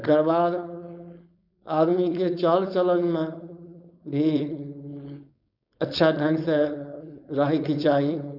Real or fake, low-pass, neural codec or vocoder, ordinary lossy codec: fake; 5.4 kHz; codec, 16 kHz, 4.8 kbps, FACodec; none